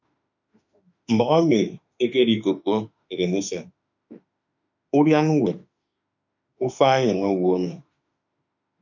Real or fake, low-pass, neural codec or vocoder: fake; 7.2 kHz; autoencoder, 48 kHz, 32 numbers a frame, DAC-VAE, trained on Japanese speech